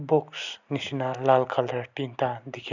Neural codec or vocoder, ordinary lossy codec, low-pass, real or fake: none; none; 7.2 kHz; real